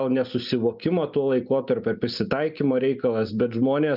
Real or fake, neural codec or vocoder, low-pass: real; none; 5.4 kHz